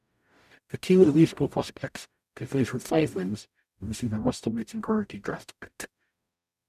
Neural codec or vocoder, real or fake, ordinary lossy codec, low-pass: codec, 44.1 kHz, 0.9 kbps, DAC; fake; none; 14.4 kHz